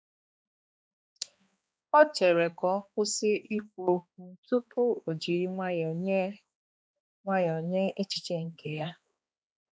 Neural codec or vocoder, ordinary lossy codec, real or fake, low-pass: codec, 16 kHz, 2 kbps, X-Codec, HuBERT features, trained on balanced general audio; none; fake; none